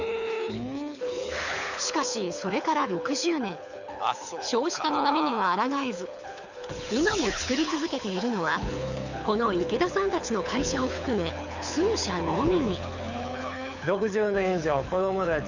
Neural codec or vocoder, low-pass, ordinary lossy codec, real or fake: codec, 24 kHz, 6 kbps, HILCodec; 7.2 kHz; none; fake